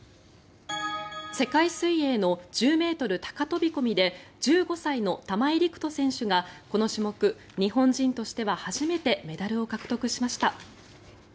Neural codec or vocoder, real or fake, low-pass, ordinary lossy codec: none; real; none; none